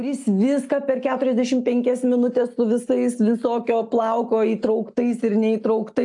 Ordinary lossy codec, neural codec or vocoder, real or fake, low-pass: AAC, 64 kbps; none; real; 10.8 kHz